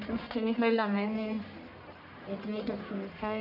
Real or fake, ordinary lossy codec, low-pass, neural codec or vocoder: fake; none; 5.4 kHz; codec, 44.1 kHz, 1.7 kbps, Pupu-Codec